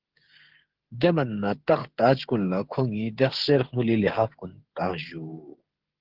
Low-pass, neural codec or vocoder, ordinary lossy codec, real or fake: 5.4 kHz; codec, 16 kHz, 16 kbps, FreqCodec, smaller model; Opus, 16 kbps; fake